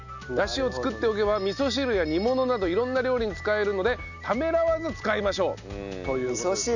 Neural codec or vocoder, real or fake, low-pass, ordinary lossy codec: none; real; 7.2 kHz; none